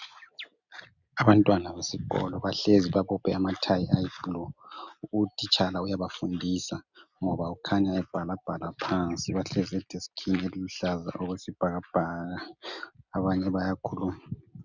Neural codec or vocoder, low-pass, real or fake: none; 7.2 kHz; real